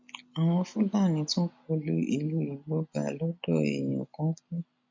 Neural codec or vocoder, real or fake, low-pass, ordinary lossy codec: none; real; 7.2 kHz; MP3, 48 kbps